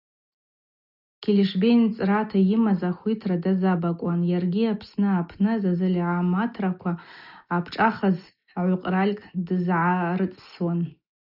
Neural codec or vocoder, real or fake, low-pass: none; real; 5.4 kHz